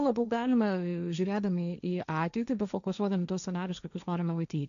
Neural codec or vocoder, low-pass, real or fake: codec, 16 kHz, 1.1 kbps, Voila-Tokenizer; 7.2 kHz; fake